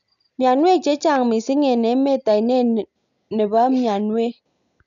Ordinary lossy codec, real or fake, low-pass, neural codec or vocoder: none; real; 7.2 kHz; none